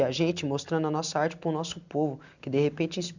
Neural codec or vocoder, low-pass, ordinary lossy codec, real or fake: none; 7.2 kHz; none; real